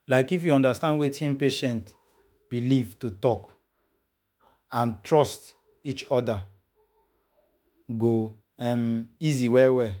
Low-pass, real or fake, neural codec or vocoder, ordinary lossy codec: none; fake; autoencoder, 48 kHz, 32 numbers a frame, DAC-VAE, trained on Japanese speech; none